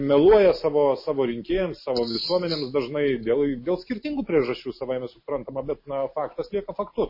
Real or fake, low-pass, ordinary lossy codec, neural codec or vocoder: real; 5.4 kHz; MP3, 24 kbps; none